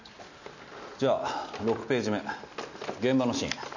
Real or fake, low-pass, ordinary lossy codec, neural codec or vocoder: real; 7.2 kHz; none; none